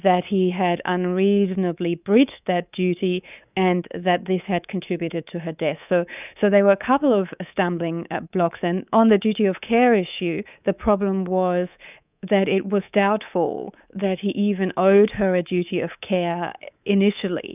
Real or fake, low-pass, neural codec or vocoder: fake; 3.6 kHz; codec, 24 kHz, 3.1 kbps, DualCodec